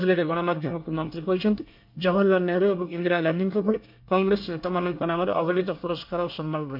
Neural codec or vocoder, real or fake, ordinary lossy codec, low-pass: codec, 24 kHz, 1 kbps, SNAC; fake; MP3, 48 kbps; 5.4 kHz